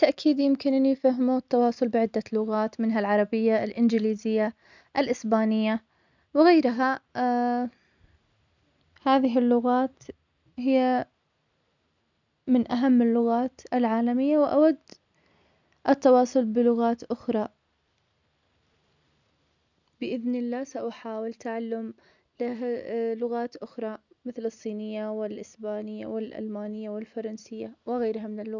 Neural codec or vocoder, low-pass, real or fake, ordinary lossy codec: none; 7.2 kHz; real; none